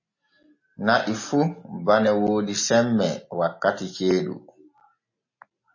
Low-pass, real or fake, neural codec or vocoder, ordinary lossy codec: 7.2 kHz; real; none; MP3, 32 kbps